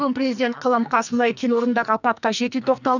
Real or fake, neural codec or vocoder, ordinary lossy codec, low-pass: fake; codec, 44.1 kHz, 2.6 kbps, SNAC; none; 7.2 kHz